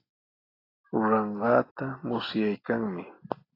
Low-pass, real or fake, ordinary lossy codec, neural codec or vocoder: 5.4 kHz; real; AAC, 24 kbps; none